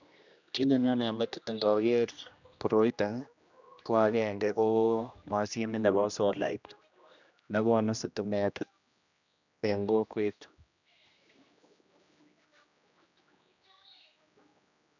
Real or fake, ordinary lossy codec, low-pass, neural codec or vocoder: fake; none; 7.2 kHz; codec, 16 kHz, 1 kbps, X-Codec, HuBERT features, trained on general audio